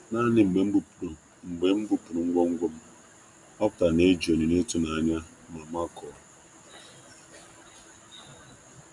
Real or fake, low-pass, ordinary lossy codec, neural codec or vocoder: real; 10.8 kHz; none; none